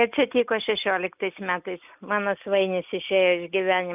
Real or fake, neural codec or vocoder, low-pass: real; none; 3.6 kHz